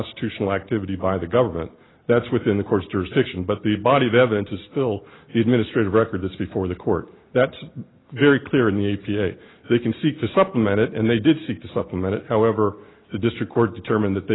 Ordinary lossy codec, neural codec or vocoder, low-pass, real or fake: AAC, 16 kbps; none; 7.2 kHz; real